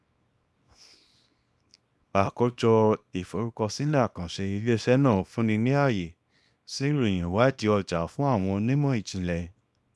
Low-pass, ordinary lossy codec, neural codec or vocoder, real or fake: none; none; codec, 24 kHz, 0.9 kbps, WavTokenizer, small release; fake